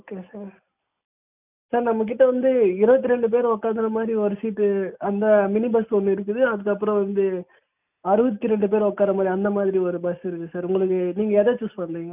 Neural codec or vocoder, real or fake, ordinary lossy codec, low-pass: none; real; none; 3.6 kHz